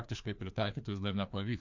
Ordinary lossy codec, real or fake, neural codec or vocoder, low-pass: MP3, 64 kbps; fake; codec, 16 kHz, 2 kbps, FreqCodec, larger model; 7.2 kHz